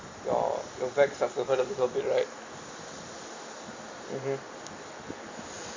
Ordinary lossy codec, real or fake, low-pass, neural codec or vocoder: MP3, 64 kbps; real; 7.2 kHz; none